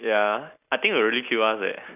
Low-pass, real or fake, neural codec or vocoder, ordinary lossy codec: 3.6 kHz; real; none; none